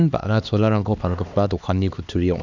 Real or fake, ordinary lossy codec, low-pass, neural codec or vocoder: fake; none; 7.2 kHz; codec, 16 kHz, 2 kbps, X-Codec, HuBERT features, trained on LibriSpeech